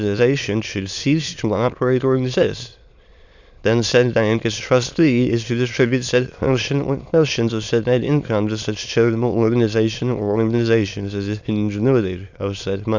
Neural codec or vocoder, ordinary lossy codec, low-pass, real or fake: autoencoder, 22.05 kHz, a latent of 192 numbers a frame, VITS, trained on many speakers; Opus, 64 kbps; 7.2 kHz; fake